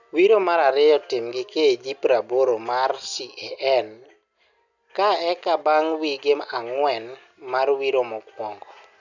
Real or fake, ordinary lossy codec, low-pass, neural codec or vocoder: real; none; 7.2 kHz; none